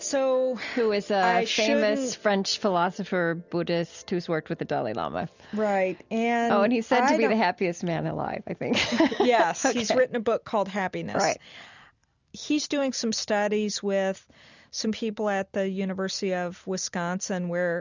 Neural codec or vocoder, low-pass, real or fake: none; 7.2 kHz; real